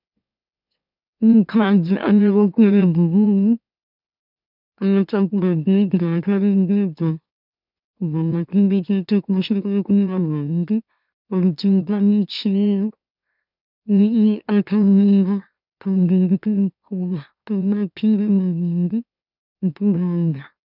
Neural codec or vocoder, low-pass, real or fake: autoencoder, 44.1 kHz, a latent of 192 numbers a frame, MeloTTS; 5.4 kHz; fake